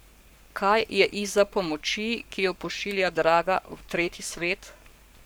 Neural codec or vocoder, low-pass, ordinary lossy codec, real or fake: codec, 44.1 kHz, 7.8 kbps, Pupu-Codec; none; none; fake